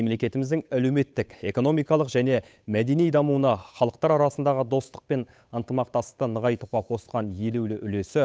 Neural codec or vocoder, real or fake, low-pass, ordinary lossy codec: codec, 16 kHz, 8 kbps, FunCodec, trained on Chinese and English, 25 frames a second; fake; none; none